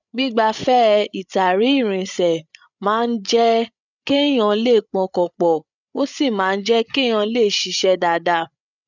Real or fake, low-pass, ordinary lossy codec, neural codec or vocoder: fake; 7.2 kHz; none; codec, 16 kHz, 8 kbps, FreqCodec, larger model